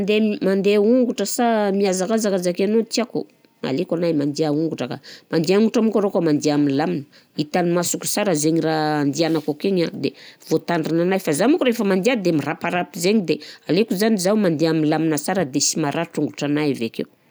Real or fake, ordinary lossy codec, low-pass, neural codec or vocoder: real; none; none; none